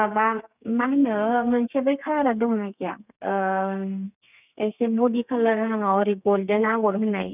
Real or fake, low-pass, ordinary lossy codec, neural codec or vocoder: fake; 3.6 kHz; none; codec, 32 kHz, 1.9 kbps, SNAC